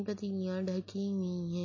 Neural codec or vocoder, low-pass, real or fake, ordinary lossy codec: none; 7.2 kHz; real; MP3, 32 kbps